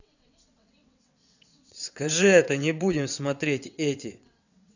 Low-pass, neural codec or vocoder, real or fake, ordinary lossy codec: 7.2 kHz; vocoder, 22.05 kHz, 80 mel bands, WaveNeXt; fake; none